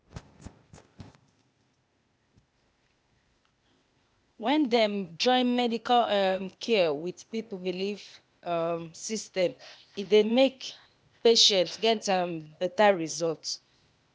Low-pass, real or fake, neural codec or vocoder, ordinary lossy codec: none; fake; codec, 16 kHz, 0.8 kbps, ZipCodec; none